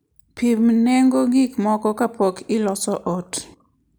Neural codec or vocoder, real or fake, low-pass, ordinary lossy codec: none; real; none; none